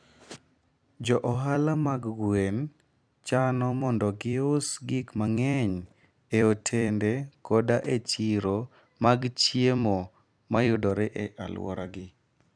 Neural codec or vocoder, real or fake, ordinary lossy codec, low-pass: vocoder, 44.1 kHz, 128 mel bands every 256 samples, BigVGAN v2; fake; none; 9.9 kHz